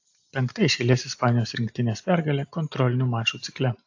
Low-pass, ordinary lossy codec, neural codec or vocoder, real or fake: 7.2 kHz; AAC, 48 kbps; none; real